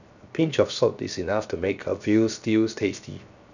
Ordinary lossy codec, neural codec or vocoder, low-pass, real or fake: none; codec, 16 kHz, 0.7 kbps, FocalCodec; 7.2 kHz; fake